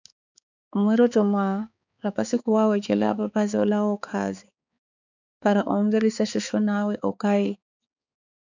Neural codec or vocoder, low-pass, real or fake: codec, 16 kHz, 4 kbps, X-Codec, HuBERT features, trained on balanced general audio; 7.2 kHz; fake